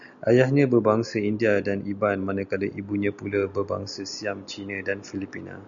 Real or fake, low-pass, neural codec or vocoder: real; 7.2 kHz; none